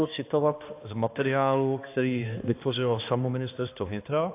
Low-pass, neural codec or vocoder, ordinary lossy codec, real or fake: 3.6 kHz; codec, 16 kHz, 2 kbps, X-Codec, HuBERT features, trained on balanced general audio; AAC, 24 kbps; fake